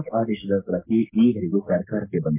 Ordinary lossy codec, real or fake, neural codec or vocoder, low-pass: MP3, 24 kbps; fake; codec, 44.1 kHz, 3.4 kbps, Pupu-Codec; 3.6 kHz